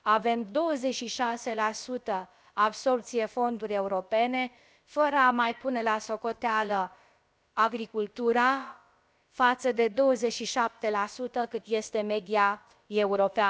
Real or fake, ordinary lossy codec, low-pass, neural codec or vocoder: fake; none; none; codec, 16 kHz, about 1 kbps, DyCAST, with the encoder's durations